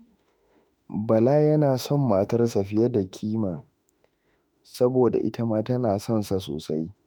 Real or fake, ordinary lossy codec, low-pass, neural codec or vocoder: fake; none; none; autoencoder, 48 kHz, 32 numbers a frame, DAC-VAE, trained on Japanese speech